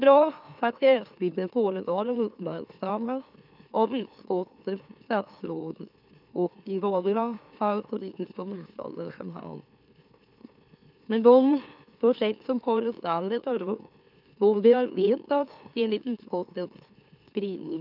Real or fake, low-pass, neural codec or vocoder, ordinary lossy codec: fake; 5.4 kHz; autoencoder, 44.1 kHz, a latent of 192 numbers a frame, MeloTTS; none